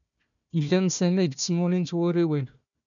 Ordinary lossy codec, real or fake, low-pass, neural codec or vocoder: MP3, 96 kbps; fake; 7.2 kHz; codec, 16 kHz, 1 kbps, FunCodec, trained on Chinese and English, 50 frames a second